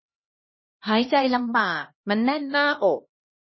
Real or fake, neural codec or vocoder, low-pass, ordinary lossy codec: fake; codec, 16 kHz, 1 kbps, X-Codec, HuBERT features, trained on LibriSpeech; 7.2 kHz; MP3, 24 kbps